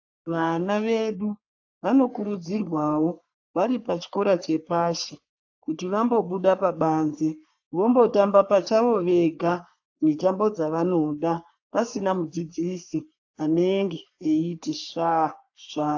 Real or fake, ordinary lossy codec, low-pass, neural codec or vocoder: fake; AAC, 48 kbps; 7.2 kHz; codec, 44.1 kHz, 3.4 kbps, Pupu-Codec